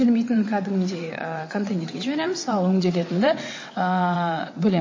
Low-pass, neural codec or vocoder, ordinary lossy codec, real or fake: 7.2 kHz; vocoder, 22.05 kHz, 80 mel bands, WaveNeXt; MP3, 32 kbps; fake